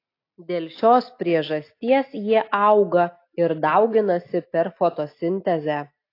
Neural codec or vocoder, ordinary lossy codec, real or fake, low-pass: none; AAC, 32 kbps; real; 5.4 kHz